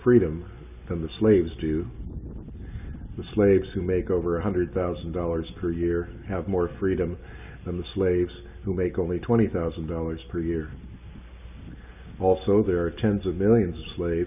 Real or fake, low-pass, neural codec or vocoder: real; 3.6 kHz; none